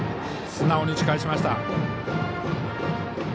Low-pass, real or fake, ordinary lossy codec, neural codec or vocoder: none; real; none; none